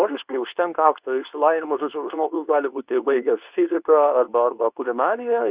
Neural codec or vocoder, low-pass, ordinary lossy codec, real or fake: codec, 24 kHz, 0.9 kbps, WavTokenizer, medium speech release version 2; 3.6 kHz; Opus, 64 kbps; fake